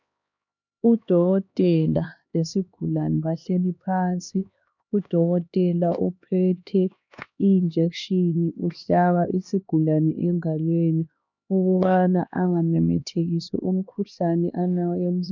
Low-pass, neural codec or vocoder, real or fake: 7.2 kHz; codec, 16 kHz, 2 kbps, X-Codec, HuBERT features, trained on LibriSpeech; fake